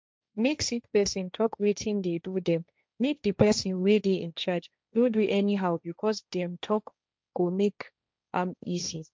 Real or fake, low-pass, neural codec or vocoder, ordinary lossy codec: fake; none; codec, 16 kHz, 1.1 kbps, Voila-Tokenizer; none